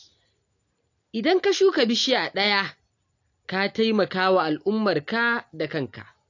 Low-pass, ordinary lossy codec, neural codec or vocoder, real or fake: 7.2 kHz; AAC, 48 kbps; none; real